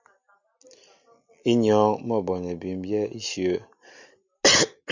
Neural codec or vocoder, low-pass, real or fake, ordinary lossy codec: none; 7.2 kHz; real; Opus, 64 kbps